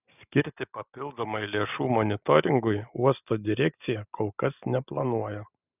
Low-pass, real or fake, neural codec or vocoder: 3.6 kHz; real; none